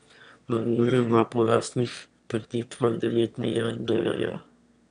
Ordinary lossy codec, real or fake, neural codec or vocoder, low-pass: none; fake; autoencoder, 22.05 kHz, a latent of 192 numbers a frame, VITS, trained on one speaker; 9.9 kHz